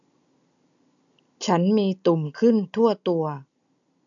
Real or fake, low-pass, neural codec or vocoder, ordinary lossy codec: real; 7.2 kHz; none; none